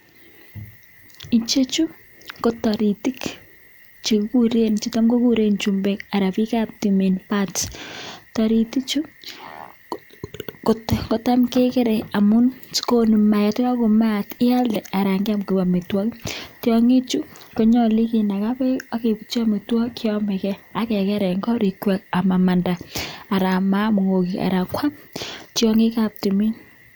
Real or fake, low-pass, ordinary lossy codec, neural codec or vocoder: real; none; none; none